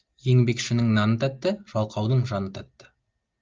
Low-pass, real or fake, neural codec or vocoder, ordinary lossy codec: 7.2 kHz; real; none; Opus, 24 kbps